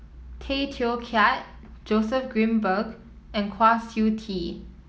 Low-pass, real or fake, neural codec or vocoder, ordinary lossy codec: none; real; none; none